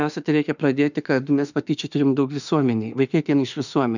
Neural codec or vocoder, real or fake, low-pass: autoencoder, 48 kHz, 32 numbers a frame, DAC-VAE, trained on Japanese speech; fake; 7.2 kHz